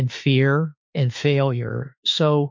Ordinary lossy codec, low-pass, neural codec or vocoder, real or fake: MP3, 48 kbps; 7.2 kHz; autoencoder, 48 kHz, 32 numbers a frame, DAC-VAE, trained on Japanese speech; fake